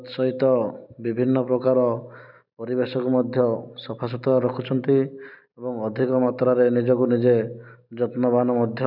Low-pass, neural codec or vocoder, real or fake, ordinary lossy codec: 5.4 kHz; none; real; none